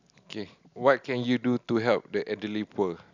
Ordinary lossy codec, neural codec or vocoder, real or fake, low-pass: none; none; real; 7.2 kHz